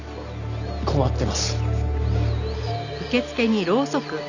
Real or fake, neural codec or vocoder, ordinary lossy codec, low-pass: real; none; none; 7.2 kHz